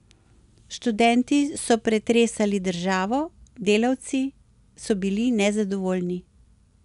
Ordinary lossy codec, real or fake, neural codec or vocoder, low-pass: none; real; none; 10.8 kHz